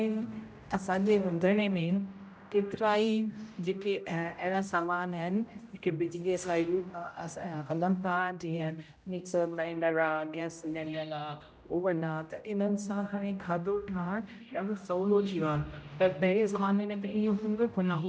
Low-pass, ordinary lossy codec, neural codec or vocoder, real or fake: none; none; codec, 16 kHz, 0.5 kbps, X-Codec, HuBERT features, trained on general audio; fake